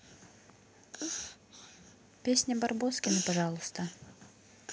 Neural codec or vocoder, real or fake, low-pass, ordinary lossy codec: none; real; none; none